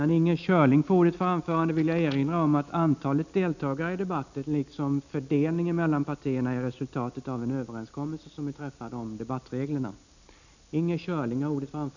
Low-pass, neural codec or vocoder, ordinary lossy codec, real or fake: 7.2 kHz; none; none; real